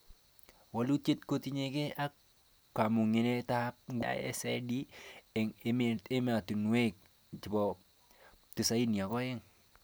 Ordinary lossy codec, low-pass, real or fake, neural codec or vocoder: none; none; real; none